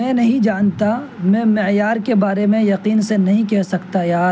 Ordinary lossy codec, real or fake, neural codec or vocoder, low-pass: none; real; none; none